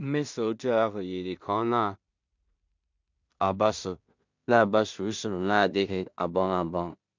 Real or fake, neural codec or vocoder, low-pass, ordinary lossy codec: fake; codec, 16 kHz in and 24 kHz out, 0.4 kbps, LongCat-Audio-Codec, two codebook decoder; 7.2 kHz; MP3, 64 kbps